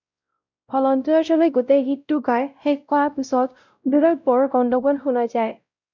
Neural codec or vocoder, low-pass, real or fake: codec, 16 kHz, 0.5 kbps, X-Codec, WavLM features, trained on Multilingual LibriSpeech; 7.2 kHz; fake